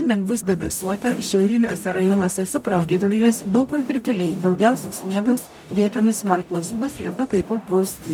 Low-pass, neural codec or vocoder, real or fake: 19.8 kHz; codec, 44.1 kHz, 0.9 kbps, DAC; fake